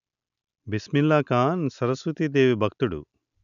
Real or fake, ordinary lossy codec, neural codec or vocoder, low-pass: real; none; none; 7.2 kHz